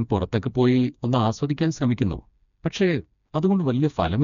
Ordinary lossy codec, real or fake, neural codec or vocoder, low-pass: none; fake; codec, 16 kHz, 2 kbps, FreqCodec, smaller model; 7.2 kHz